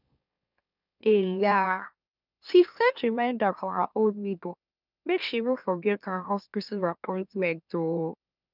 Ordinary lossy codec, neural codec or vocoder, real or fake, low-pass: none; autoencoder, 44.1 kHz, a latent of 192 numbers a frame, MeloTTS; fake; 5.4 kHz